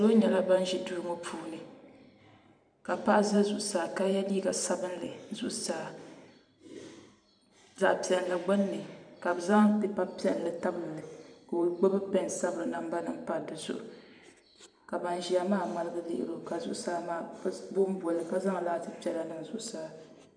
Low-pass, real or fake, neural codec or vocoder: 9.9 kHz; fake; vocoder, 44.1 kHz, 128 mel bands every 512 samples, BigVGAN v2